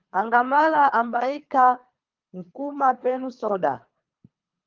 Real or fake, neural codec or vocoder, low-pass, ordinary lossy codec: fake; codec, 24 kHz, 3 kbps, HILCodec; 7.2 kHz; Opus, 32 kbps